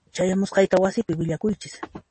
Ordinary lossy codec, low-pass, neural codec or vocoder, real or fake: MP3, 32 kbps; 10.8 kHz; none; real